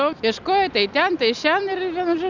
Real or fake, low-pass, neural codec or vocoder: real; 7.2 kHz; none